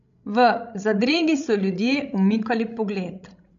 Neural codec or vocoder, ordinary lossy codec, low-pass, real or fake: codec, 16 kHz, 16 kbps, FreqCodec, larger model; none; 7.2 kHz; fake